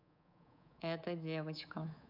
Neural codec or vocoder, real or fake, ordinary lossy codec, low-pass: codec, 24 kHz, 3.1 kbps, DualCodec; fake; none; 5.4 kHz